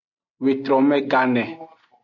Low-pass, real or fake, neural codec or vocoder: 7.2 kHz; real; none